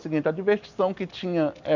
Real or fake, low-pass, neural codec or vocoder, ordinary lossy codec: real; 7.2 kHz; none; none